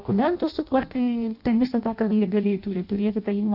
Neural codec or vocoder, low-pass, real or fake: codec, 16 kHz in and 24 kHz out, 0.6 kbps, FireRedTTS-2 codec; 5.4 kHz; fake